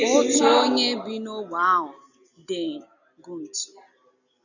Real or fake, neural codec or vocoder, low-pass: real; none; 7.2 kHz